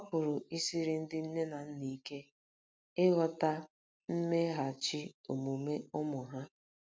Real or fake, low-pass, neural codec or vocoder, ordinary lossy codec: real; none; none; none